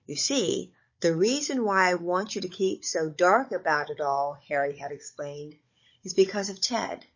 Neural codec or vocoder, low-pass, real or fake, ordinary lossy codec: codec, 16 kHz, 16 kbps, FunCodec, trained on Chinese and English, 50 frames a second; 7.2 kHz; fake; MP3, 32 kbps